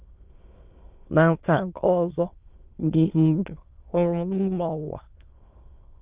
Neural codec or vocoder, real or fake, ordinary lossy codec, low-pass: autoencoder, 22.05 kHz, a latent of 192 numbers a frame, VITS, trained on many speakers; fake; Opus, 32 kbps; 3.6 kHz